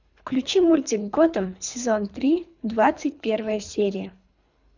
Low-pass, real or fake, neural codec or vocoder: 7.2 kHz; fake; codec, 24 kHz, 3 kbps, HILCodec